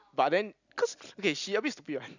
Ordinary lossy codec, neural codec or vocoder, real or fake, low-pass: none; none; real; 7.2 kHz